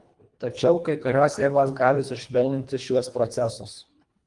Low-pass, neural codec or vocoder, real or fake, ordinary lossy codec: 10.8 kHz; codec, 24 kHz, 1.5 kbps, HILCodec; fake; Opus, 32 kbps